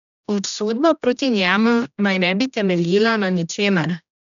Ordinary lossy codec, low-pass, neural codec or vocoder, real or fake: none; 7.2 kHz; codec, 16 kHz, 1 kbps, X-Codec, HuBERT features, trained on general audio; fake